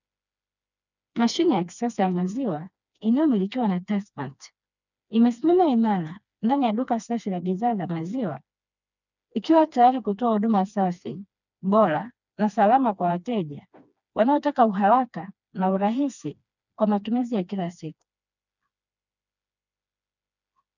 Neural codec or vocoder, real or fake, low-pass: codec, 16 kHz, 2 kbps, FreqCodec, smaller model; fake; 7.2 kHz